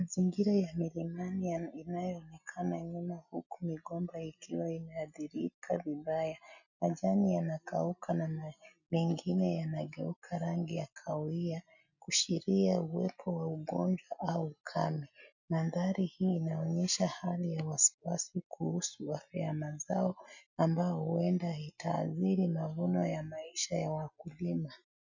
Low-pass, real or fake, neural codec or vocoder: 7.2 kHz; real; none